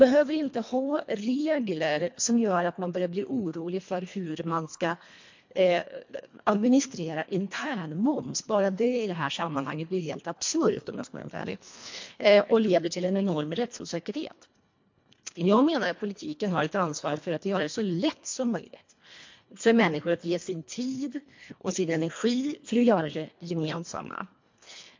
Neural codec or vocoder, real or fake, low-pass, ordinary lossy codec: codec, 24 kHz, 1.5 kbps, HILCodec; fake; 7.2 kHz; MP3, 48 kbps